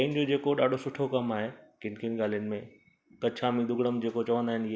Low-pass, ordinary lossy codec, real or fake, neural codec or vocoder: none; none; real; none